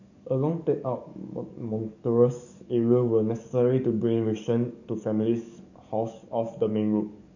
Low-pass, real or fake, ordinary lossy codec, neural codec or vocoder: 7.2 kHz; fake; none; codec, 44.1 kHz, 7.8 kbps, DAC